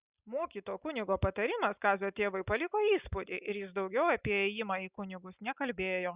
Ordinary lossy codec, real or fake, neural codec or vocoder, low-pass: Opus, 64 kbps; real; none; 3.6 kHz